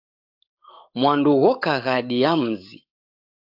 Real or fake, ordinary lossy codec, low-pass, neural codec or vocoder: fake; AAC, 48 kbps; 5.4 kHz; codec, 44.1 kHz, 7.8 kbps, DAC